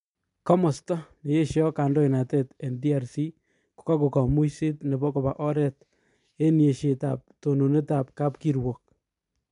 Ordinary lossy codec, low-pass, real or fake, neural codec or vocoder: MP3, 96 kbps; 10.8 kHz; real; none